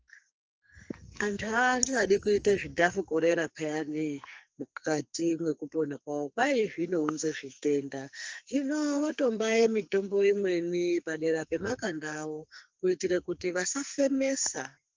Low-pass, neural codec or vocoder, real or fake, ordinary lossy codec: 7.2 kHz; codec, 44.1 kHz, 2.6 kbps, SNAC; fake; Opus, 24 kbps